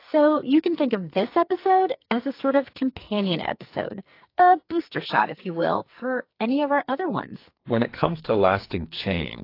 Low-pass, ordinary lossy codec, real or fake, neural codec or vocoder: 5.4 kHz; AAC, 32 kbps; fake; codec, 44.1 kHz, 2.6 kbps, SNAC